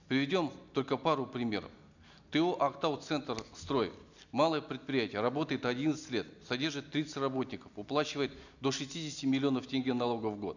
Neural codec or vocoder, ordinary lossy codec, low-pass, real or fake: none; none; 7.2 kHz; real